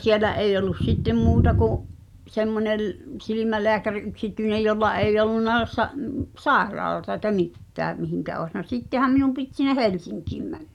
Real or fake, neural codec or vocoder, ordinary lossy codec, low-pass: real; none; none; 19.8 kHz